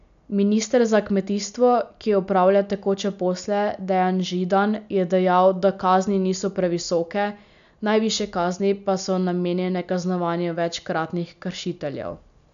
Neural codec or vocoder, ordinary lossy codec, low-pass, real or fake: none; none; 7.2 kHz; real